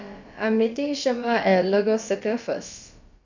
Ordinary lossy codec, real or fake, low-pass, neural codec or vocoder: Opus, 64 kbps; fake; 7.2 kHz; codec, 16 kHz, about 1 kbps, DyCAST, with the encoder's durations